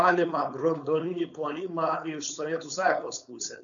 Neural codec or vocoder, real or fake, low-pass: codec, 16 kHz, 4.8 kbps, FACodec; fake; 7.2 kHz